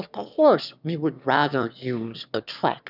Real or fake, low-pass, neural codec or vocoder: fake; 5.4 kHz; autoencoder, 22.05 kHz, a latent of 192 numbers a frame, VITS, trained on one speaker